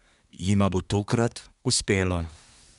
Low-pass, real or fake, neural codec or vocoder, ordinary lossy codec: 10.8 kHz; fake; codec, 24 kHz, 1 kbps, SNAC; none